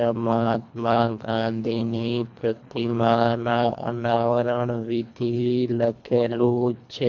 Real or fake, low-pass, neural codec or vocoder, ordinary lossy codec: fake; 7.2 kHz; codec, 24 kHz, 1.5 kbps, HILCodec; MP3, 64 kbps